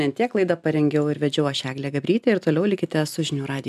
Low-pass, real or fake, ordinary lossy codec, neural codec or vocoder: 14.4 kHz; real; MP3, 96 kbps; none